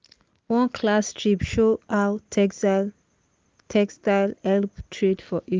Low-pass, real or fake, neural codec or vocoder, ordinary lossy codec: 7.2 kHz; real; none; Opus, 32 kbps